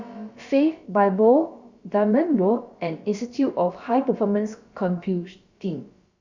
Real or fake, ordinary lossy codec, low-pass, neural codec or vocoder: fake; Opus, 64 kbps; 7.2 kHz; codec, 16 kHz, about 1 kbps, DyCAST, with the encoder's durations